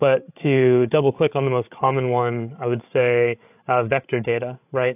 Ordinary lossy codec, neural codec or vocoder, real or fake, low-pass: AAC, 32 kbps; codec, 16 kHz, 8 kbps, FreqCodec, larger model; fake; 3.6 kHz